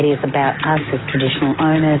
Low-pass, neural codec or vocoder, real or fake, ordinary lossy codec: 7.2 kHz; none; real; AAC, 16 kbps